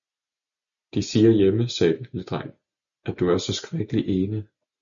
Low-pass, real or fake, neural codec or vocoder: 7.2 kHz; real; none